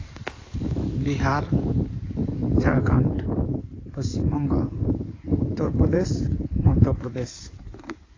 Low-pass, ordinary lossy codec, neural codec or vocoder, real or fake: 7.2 kHz; AAC, 32 kbps; codec, 16 kHz in and 24 kHz out, 2.2 kbps, FireRedTTS-2 codec; fake